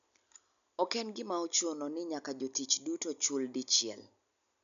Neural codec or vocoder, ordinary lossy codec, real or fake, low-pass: none; MP3, 96 kbps; real; 7.2 kHz